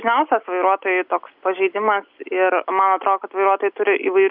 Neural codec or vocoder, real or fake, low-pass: none; real; 5.4 kHz